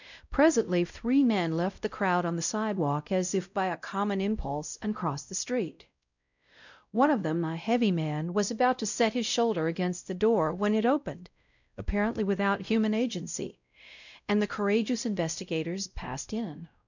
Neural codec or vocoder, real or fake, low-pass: codec, 16 kHz, 0.5 kbps, X-Codec, WavLM features, trained on Multilingual LibriSpeech; fake; 7.2 kHz